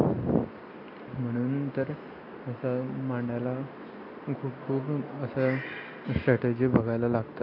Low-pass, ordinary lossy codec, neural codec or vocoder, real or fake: 5.4 kHz; MP3, 48 kbps; none; real